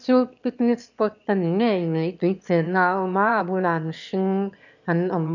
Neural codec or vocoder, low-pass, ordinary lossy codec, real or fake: autoencoder, 22.05 kHz, a latent of 192 numbers a frame, VITS, trained on one speaker; 7.2 kHz; none; fake